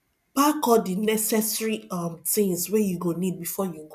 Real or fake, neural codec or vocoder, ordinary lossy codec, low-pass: real; none; none; 14.4 kHz